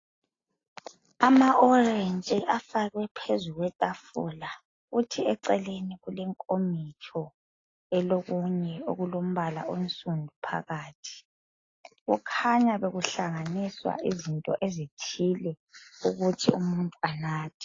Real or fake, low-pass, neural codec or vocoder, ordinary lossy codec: real; 7.2 kHz; none; AAC, 32 kbps